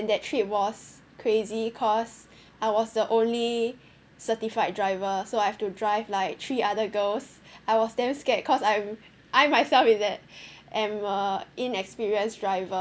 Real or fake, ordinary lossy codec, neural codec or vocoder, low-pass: real; none; none; none